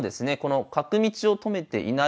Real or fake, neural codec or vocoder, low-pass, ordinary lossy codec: real; none; none; none